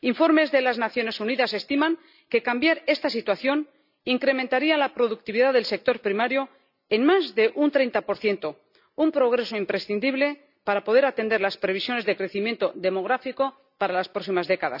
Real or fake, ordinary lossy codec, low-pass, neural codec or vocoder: real; none; 5.4 kHz; none